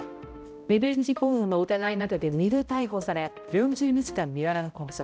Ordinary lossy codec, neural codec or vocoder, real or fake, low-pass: none; codec, 16 kHz, 0.5 kbps, X-Codec, HuBERT features, trained on balanced general audio; fake; none